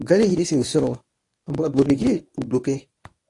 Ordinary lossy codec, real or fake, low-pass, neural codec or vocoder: AAC, 48 kbps; fake; 10.8 kHz; codec, 24 kHz, 0.9 kbps, WavTokenizer, medium speech release version 1